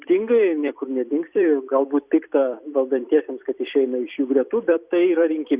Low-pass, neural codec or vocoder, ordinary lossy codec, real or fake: 3.6 kHz; vocoder, 44.1 kHz, 128 mel bands every 256 samples, BigVGAN v2; Opus, 64 kbps; fake